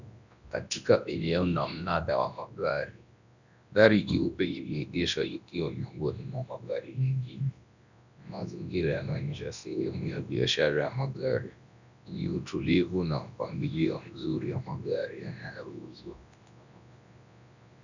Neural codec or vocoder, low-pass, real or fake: codec, 24 kHz, 0.9 kbps, WavTokenizer, large speech release; 7.2 kHz; fake